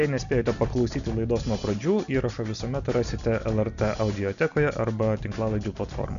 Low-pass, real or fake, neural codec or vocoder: 7.2 kHz; real; none